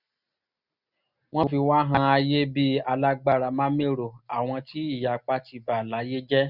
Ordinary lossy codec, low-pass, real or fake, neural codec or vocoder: none; 5.4 kHz; real; none